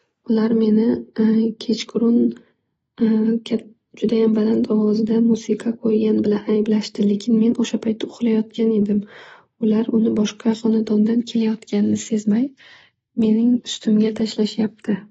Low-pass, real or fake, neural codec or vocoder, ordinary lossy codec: 19.8 kHz; real; none; AAC, 24 kbps